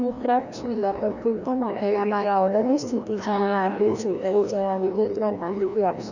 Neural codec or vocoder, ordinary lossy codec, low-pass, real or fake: codec, 16 kHz, 1 kbps, FreqCodec, larger model; none; 7.2 kHz; fake